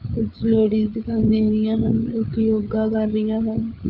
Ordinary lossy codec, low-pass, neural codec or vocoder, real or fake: Opus, 24 kbps; 5.4 kHz; codec, 16 kHz, 8 kbps, FreqCodec, larger model; fake